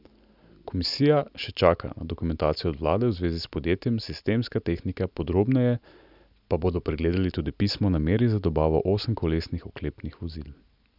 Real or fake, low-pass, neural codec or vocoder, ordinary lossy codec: real; 5.4 kHz; none; none